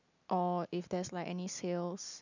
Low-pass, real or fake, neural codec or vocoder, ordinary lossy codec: 7.2 kHz; real; none; none